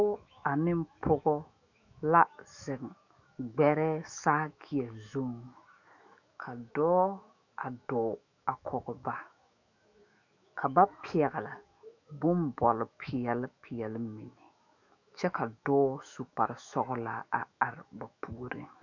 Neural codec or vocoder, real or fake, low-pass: autoencoder, 48 kHz, 128 numbers a frame, DAC-VAE, trained on Japanese speech; fake; 7.2 kHz